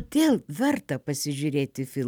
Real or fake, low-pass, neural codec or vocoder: fake; 19.8 kHz; vocoder, 44.1 kHz, 128 mel bands every 256 samples, BigVGAN v2